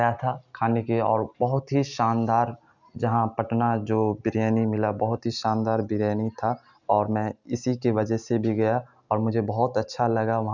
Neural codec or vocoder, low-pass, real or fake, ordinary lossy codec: none; 7.2 kHz; real; none